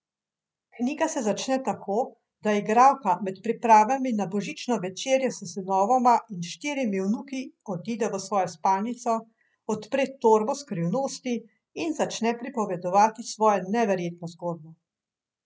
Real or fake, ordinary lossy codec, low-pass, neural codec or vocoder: real; none; none; none